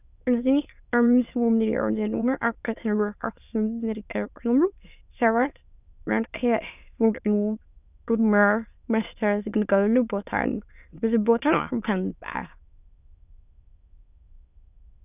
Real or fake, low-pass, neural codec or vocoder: fake; 3.6 kHz; autoencoder, 22.05 kHz, a latent of 192 numbers a frame, VITS, trained on many speakers